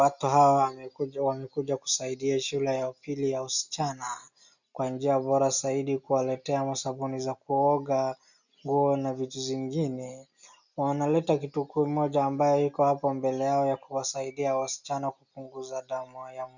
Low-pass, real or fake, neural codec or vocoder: 7.2 kHz; real; none